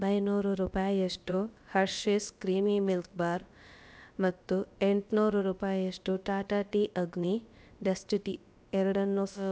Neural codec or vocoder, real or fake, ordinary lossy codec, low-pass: codec, 16 kHz, about 1 kbps, DyCAST, with the encoder's durations; fake; none; none